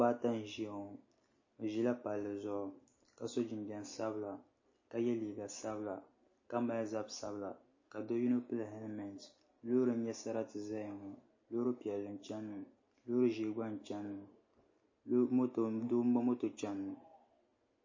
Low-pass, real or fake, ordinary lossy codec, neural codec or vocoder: 7.2 kHz; real; MP3, 32 kbps; none